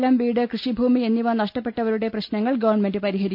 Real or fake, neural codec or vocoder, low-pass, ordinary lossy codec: real; none; 5.4 kHz; none